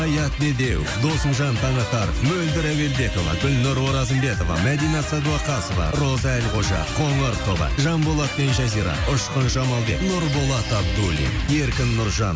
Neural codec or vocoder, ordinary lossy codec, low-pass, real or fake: none; none; none; real